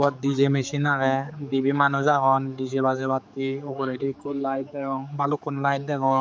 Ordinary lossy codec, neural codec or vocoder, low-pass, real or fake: none; codec, 16 kHz, 4 kbps, X-Codec, HuBERT features, trained on general audio; none; fake